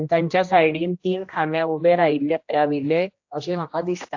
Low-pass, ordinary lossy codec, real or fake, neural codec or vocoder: 7.2 kHz; AAC, 48 kbps; fake; codec, 16 kHz, 1 kbps, X-Codec, HuBERT features, trained on general audio